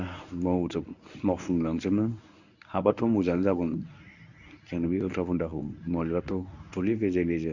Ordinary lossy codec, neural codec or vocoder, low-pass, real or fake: none; codec, 24 kHz, 0.9 kbps, WavTokenizer, medium speech release version 1; 7.2 kHz; fake